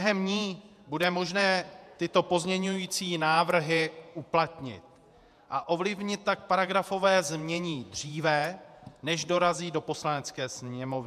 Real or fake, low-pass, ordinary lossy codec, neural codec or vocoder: fake; 14.4 kHz; MP3, 96 kbps; vocoder, 48 kHz, 128 mel bands, Vocos